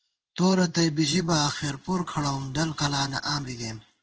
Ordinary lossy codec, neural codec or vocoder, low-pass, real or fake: Opus, 16 kbps; codec, 16 kHz in and 24 kHz out, 1 kbps, XY-Tokenizer; 7.2 kHz; fake